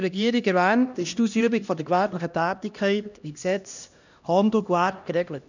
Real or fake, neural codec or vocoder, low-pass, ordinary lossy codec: fake; codec, 16 kHz, 1 kbps, X-Codec, HuBERT features, trained on LibriSpeech; 7.2 kHz; none